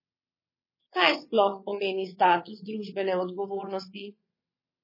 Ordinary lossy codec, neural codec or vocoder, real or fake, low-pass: MP3, 24 kbps; vocoder, 22.05 kHz, 80 mel bands, WaveNeXt; fake; 5.4 kHz